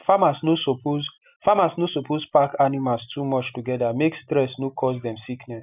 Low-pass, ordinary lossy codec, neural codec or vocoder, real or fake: 3.6 kHz; none; none; real